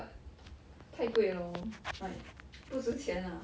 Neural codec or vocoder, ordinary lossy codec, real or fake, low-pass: none; none; real; none